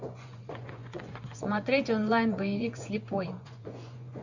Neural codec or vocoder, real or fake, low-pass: vocoder, 24 kHz, 100 mel bands, Vocos; fake; 7.2 kHz